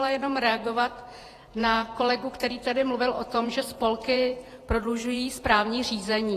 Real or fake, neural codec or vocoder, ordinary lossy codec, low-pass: fake; vocoder, 48 kHz, 128 mel bands, Vocos; AAC, 48 kbps; 14.4 kHz